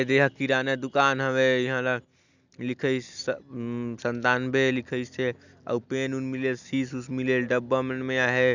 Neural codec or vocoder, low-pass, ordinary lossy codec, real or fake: none; 7.2 kHz; none; real